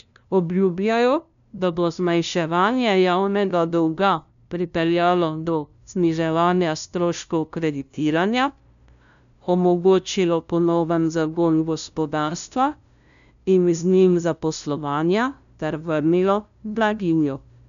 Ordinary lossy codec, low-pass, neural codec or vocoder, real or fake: none; 7.2 kHz; codec, 16 kHz, 0.5 kbps, FunCodec, trained on LibriTTS, 25 frames a second; fake